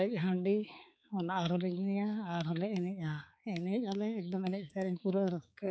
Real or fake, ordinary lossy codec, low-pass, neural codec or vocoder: fake; none; none; codec, 16 kHz, 4 kbps, X-Codec, HuBERT features, trained on balanced general audio